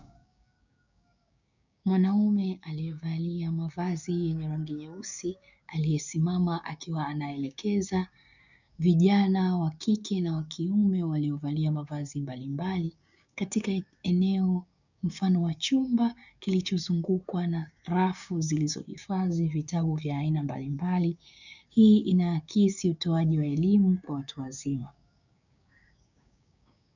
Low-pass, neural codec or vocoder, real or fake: 7.2 kHz; codec, 16 kHz, 6 kbps, DAC; fake